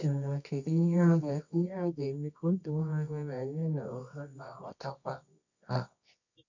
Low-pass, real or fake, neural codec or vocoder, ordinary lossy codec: 7.2 kHz; fake; codec, 24 kHz, 0.9 kbps, WavTokenizer, medium music audio release; none